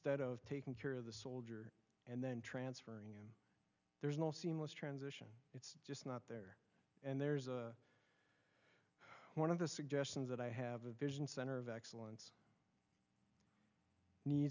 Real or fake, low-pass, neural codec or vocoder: real; 7.2 kHz; none